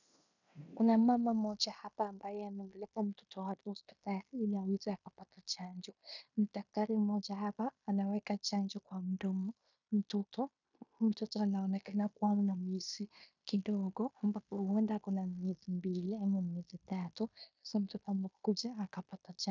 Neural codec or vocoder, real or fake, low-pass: codec, 16 kHz in and 24 kHz out, 0.9 kbps, LongCat-Audio-Codec, fine tuned four codebook decoder; fake; 7.2 kHz